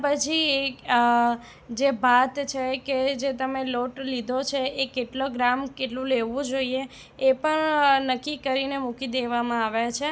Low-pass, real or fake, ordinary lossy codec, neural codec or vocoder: none; real; none; none